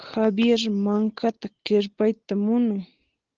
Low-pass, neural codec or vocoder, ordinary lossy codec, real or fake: 7.2 kHz; none; Opus, 16 kbps; real